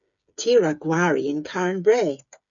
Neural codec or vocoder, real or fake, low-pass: codec, 16 kHz, 8 kbps, FreqCodec, smaller model; fake; 7.2 kHz